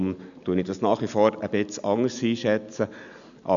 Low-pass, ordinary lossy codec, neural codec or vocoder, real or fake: 7.2 kHz; none; none; real